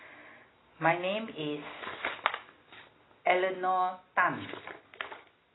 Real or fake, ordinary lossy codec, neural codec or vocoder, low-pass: real; AAC, 16 kbps; none; 7.2 kHz